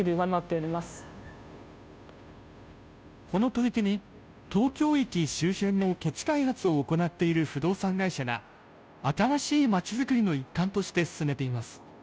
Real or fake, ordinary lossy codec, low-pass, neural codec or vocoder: fake; none; none; codec, 16 kHz, 0.5 kbps, FunCodec, trained on Chinese and English, 25 frames a second